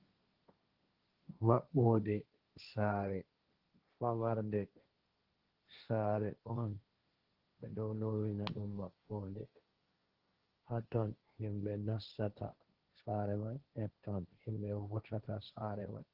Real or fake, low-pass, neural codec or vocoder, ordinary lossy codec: fake; 5.4 kHz; codec, 16 kHz, 1.1 kbps, Voila-Tokenizer; Opus, 16 kbps